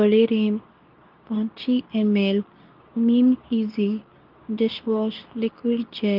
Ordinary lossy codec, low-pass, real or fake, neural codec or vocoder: Opus, 24 kbps; 5.4 kHz; fake; codec, 24 kHz, 0.9 kbps, WavTokenizer, medium speech release version 2